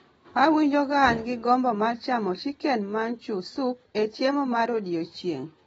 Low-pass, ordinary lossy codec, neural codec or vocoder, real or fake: 19.8 kHz; AAC, 24 kbps; none; real